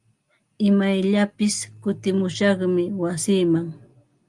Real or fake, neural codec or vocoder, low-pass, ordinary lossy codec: real; none; 10.8 kHz; Opus, 32 kbps